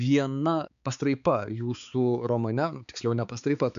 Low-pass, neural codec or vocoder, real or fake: 7.2 kHz; codec, 16 kHz, 4 kbps, X-Codec, HuBERT features, trained on balanced general audio; fake